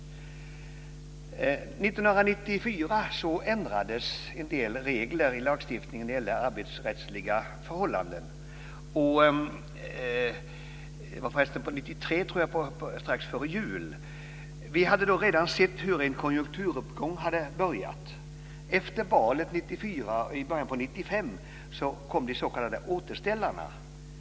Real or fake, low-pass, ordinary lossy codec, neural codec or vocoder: real; none; none; none